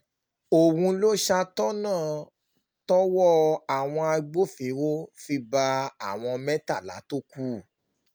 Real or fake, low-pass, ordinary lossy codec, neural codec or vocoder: real; none; none; none